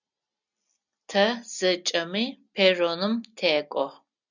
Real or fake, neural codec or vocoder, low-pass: real; none; 7.2 kHz